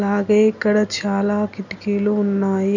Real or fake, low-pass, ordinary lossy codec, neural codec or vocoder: real; 7.2 kHz; none; none